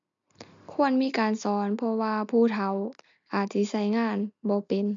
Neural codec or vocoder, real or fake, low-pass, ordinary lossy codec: none; real; 7.2 kHz; MP3, 64 kbps